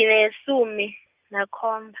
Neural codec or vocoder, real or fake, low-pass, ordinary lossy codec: none; real; 3.6 kHz; Opus, 64 kbps